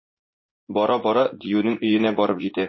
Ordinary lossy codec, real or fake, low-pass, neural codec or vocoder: MP3, 24 kbps; fake; 7.2 kHz; vocoder, 44.1 kHz, 80 mel bands, Vocos